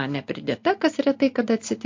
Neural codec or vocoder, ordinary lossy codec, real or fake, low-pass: none; MP3, 48 kbps; real; 7.2 kHz